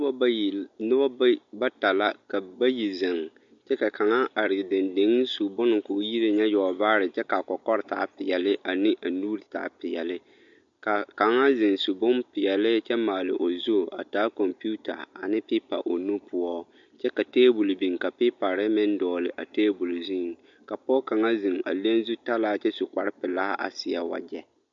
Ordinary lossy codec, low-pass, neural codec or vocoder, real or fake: MP3, 48 kbps; 7.2 kHz; none; real